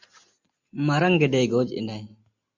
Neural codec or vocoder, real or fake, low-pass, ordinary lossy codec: none; real; 7.2 kHz; MP3, 64 kbps